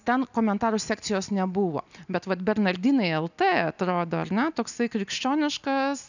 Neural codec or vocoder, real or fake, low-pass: none; real; 7.2 kHz